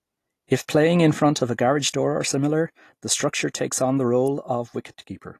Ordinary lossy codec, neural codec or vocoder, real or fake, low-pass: AAC, 48 kbps; none; real; 14.4 kHz